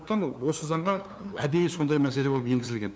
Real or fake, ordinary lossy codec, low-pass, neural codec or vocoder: fake; none; none; codec, 16 kHz, 2 kbps, FunCodec, trained on LibriTTS, 25 frames a second